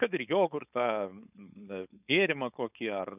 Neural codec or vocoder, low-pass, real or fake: codec, 16 kHz, 4.8 kbps, FACodec; 3.6 kHz; fake